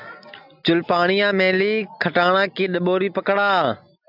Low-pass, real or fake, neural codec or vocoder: 5.4 kHz; real; none